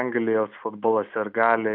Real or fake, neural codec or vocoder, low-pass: real; none; 5.4 kHz